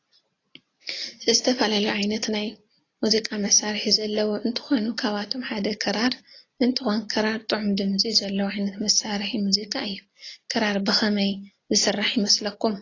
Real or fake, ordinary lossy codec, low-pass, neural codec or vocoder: real; AAC, 32 kbps; 7.2 kHz; none